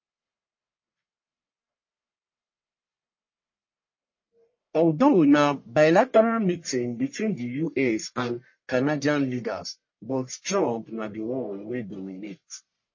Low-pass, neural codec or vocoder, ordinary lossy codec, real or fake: 7.2 kHz; codec, 44.1 kHz, 1.7 kbps, Pupu-Codec; MP3, 32 kbps; fake